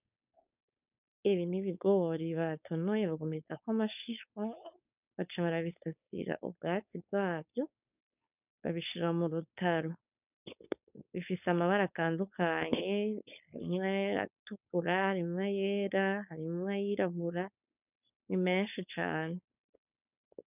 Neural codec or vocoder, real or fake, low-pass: codec, 16 kHz, 4.8 kbps, FACodec; fake; 3.6 kHz